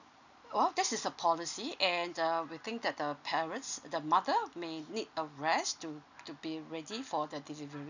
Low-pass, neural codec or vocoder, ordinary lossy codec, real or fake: 7.2 kHz; none; none; real